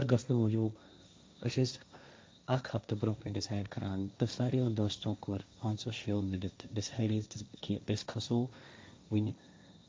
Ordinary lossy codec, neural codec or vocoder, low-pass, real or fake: none; codec, 16 kHz, 1.1 kbps, Voila-Tokenizer; none; fake